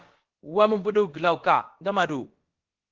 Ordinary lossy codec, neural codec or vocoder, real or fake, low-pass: Opus, 16 kbps; codec, 16 kHz, about 1 kbps, DyCAST, with the encoder's durations; fake; 7.2 kHz